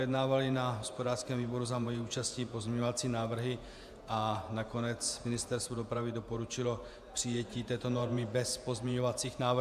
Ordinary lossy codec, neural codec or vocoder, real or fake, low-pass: AAC, 96 kbps; vocoder, 48 kHz, 128 mel bands, Vocos; fake; 14.4 kHz